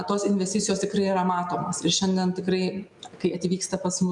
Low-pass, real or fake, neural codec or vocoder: 10.8 kHz; real; none